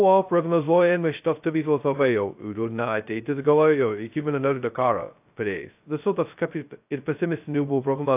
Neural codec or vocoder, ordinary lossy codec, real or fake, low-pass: codec, 16 kHz, 0.2 kbps, FocalCodec; AAC, 32 kbps; fake; 3.6 kHz